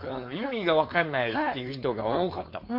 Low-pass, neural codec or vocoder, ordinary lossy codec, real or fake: 5.4 kHz; codec, 16 kHz, 4.8 kbps, FACodec; MP3, 32 kbps; fake